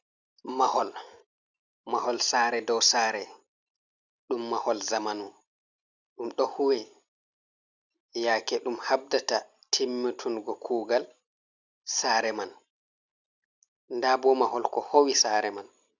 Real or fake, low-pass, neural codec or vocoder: real; 7.2 kHz; none